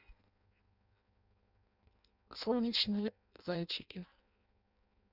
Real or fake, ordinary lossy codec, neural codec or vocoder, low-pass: fake; none; codec, 16 kHz in and 24 kHz out, 0.6 kbps, FireRedTTS-2 codec; 5.4 kHz